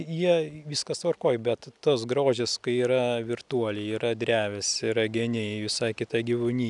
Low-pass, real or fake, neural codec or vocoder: 10.8 kHz; real; none